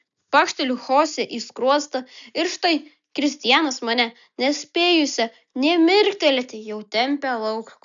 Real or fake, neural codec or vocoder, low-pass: real; none; 7.2 kHz